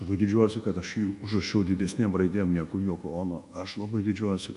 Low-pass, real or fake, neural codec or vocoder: 10.8 kHz; fake; codec, 24 kHz, 1.2 kbps, DualCodec